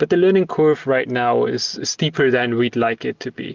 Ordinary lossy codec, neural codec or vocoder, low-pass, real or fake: Opus, 24 kbps; none; 7.2 kHz; real